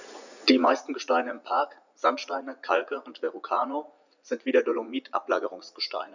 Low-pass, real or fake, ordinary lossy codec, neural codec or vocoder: 7.2 kHz; fake; none; vocoder, 44.1 kHz, 80 mel bands, Vocos